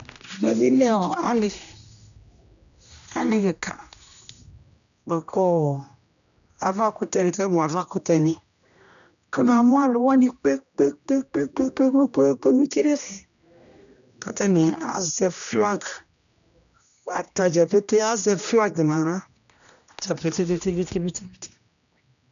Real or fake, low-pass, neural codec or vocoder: fake; 7.2 kHz; codec, 16 kHz, 1 kbps, X-Codec, HuBERT features, trained on general audio